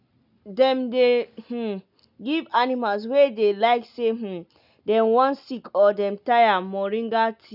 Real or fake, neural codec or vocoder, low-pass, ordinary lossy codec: real; none; 5.4 kHz; none